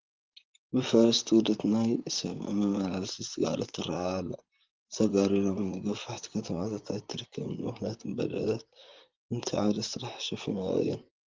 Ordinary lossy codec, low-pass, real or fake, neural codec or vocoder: Opus, 32 kbps; 7.2 kHz; fake; vocoder, 44.1 kHz, 128 mel bands, Pupu-Vocoder